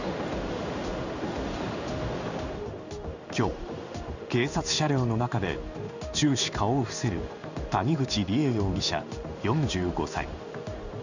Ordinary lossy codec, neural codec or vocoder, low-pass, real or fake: none; codec, 16 kHz in and 24 kHz out, 1 kbps, XY-Tokenizer; 7.2 kHz; fake